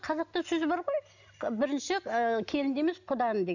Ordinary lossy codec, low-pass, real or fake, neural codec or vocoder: none; 7.2 kHz; real; none